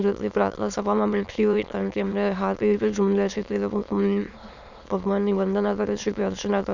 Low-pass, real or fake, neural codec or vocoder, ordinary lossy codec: 7.2 kHz; fake; autoencoder, 22.05 kHz, a latent of 192 numbers a frame, VITS, trained on many speakers; none